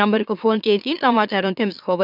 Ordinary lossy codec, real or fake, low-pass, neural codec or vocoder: none; fake; 5.4 kHz; autoencoder, 44.1 kHz, a latent of 192 numbers a frame, MeloTTS